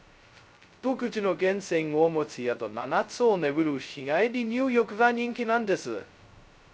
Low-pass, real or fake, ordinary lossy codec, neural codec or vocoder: none; fake; none; codec, 16 kHz, 0.2 kbps, FocalCodec